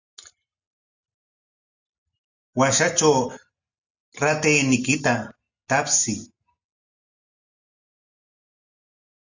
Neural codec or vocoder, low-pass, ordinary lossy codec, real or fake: none; 7.2 kHz; Opus, 64 kbps; real